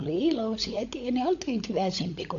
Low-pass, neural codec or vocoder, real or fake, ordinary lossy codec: 7.2 kHz; codec, 16 kHz, 16 kbps, FunCodec, trained on LibriTTS, 50 frames a second; fake; AAC, 48 kbps